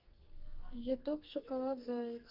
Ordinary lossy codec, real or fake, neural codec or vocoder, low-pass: Opus, 32 kbps; fake; codec, 44.1 kHz, 2.6 kbps, SNAC; 5.4 kHz